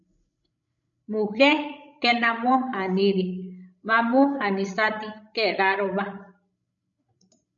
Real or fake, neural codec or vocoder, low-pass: fake; codec, 16 kHz, 16 kbps, FreqCodec, larger model; 7.2 kHz